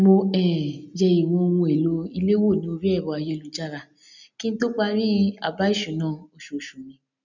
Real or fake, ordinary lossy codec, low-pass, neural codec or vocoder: real; none; 7.2 kHz; none